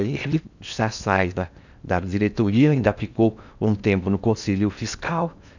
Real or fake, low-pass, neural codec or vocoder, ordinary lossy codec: fake; 7.2 kHz; codec, 16 kHz in and 24 kHz out, 0.8 kbps, FocalCodec, streaming, 65536 codes; none